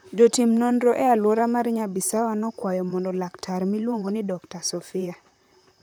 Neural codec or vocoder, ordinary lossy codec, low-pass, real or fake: vocoder, 44.1 kHz, 128 mel bands, Pupu-Vocoder; none; none; fake